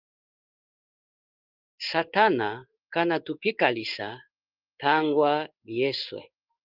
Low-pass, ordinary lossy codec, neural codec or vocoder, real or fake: 5.4 kHz; Opus, 32 kbps; codec, 16 kHz in and 24 kHz out, 1 kbps, XY-Tokenizer; fake